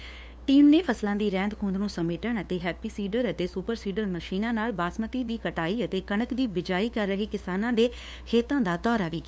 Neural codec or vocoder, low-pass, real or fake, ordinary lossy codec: codec, 16 kHz, 2 kbps, FunCodec, trained on LibriTTS, 25 frames a second; none; fake; none